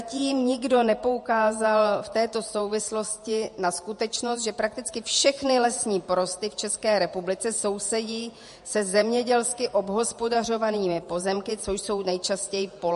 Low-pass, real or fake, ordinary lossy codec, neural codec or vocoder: 14.4 kHz; fake; MP3, 48 kbps; vocoder, 48 kHz, 128 mel bands, Vocos